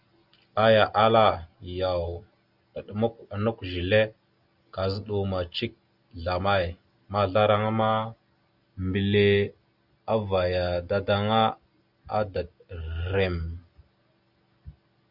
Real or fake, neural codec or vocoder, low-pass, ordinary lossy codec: real; none; 5.4 kHz; Opus, 64 kbps